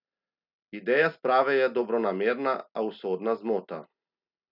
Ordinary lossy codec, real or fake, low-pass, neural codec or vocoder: none; real; 5.4 kHz; none